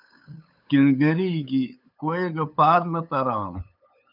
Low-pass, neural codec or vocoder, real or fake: 5.4 kHz; codec, 16 kHz, 8 kbps, FunCodec, trained on LibriTTS, 25 frames a second; fake